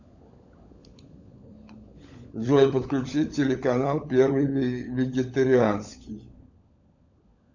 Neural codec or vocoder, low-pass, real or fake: codec, 16 kHz, 16 kbps, FunCodec, trained on LibriTTS, 50 frames a second; 7.2 kHz; fake